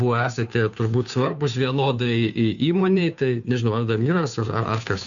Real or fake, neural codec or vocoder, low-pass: fake; codec, 16 kHz, 2 kbps, FunCodec, trained on Chinese and English, 25 frames a second; 7.2 kHz